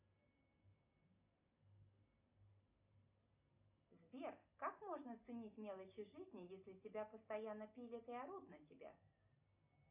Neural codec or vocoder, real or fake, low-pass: none; real; 3.6 kHz